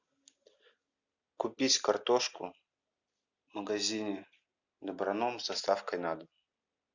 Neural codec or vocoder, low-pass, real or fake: none; 7.2 kHz; real